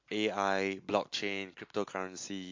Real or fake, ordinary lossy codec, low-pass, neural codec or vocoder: real; MP3, 48 kbps; 7.2 kHz; none